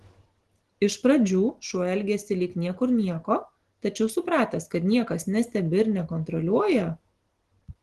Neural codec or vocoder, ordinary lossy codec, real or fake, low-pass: none; Opus, 16 kbps; real; 10.8 kHz